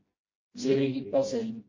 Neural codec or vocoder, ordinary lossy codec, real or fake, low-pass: codec, 16 kHz, 1 kbps, FreqCodec, smaller model; MP3, 32 kbps; fake; 7.2 kHz